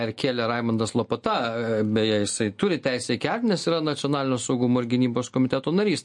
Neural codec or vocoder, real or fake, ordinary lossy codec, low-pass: none; real; MP3, 48 kbps; 10.8 kHz